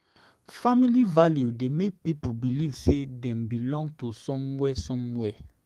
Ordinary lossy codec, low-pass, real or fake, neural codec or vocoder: Opus, 32 kbps; 14.4 kHz; fake; codec, 32 kHz, 1.9 kbps, SNAC